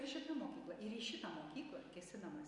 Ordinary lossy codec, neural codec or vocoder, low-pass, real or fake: Opus, 64 kbps; none; 10.8 kHz; real